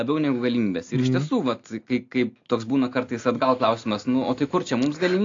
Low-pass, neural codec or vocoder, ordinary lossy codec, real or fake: 7.2 kHz; none; AAC, 48 kbps; real